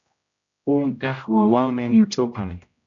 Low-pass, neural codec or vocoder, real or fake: 7.2 kHz; codec, 16 kHz, 0.5 kbps, X-Codec, HuBERT features, trained on general audio; fake